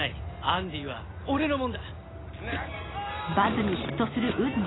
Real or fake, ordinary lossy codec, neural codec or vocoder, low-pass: real; AAC, 16 kbps; none; 7.2 kHz